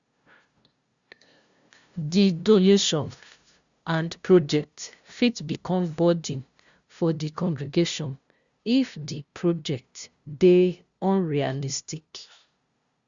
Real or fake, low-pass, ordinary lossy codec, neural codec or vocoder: fake; 7.2 kHz; Opus, 64 kbps; codec, 16 kHz, 0.5 kbps, FunCodec, trained on LibriTTS, 25 frames a second